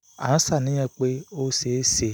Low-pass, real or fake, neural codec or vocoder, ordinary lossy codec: none; real; none; none